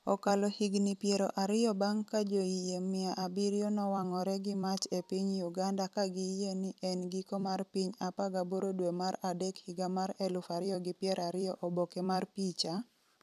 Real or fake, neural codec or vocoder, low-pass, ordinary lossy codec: fake; vocoder, 44.1 kHz, 128 mel bands every 256 samples, BigVGAN v2; 14.4 kHz; none